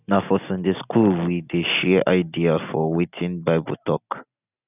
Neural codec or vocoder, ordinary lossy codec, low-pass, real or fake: none; none; 3.6 kHz; real